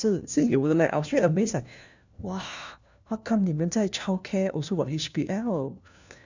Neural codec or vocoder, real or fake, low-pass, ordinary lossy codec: codec, 16 kHz, 1 kbps, FunCodec, trained on LibriTTS, 50 frames a second; fake; 7.2 kHz; none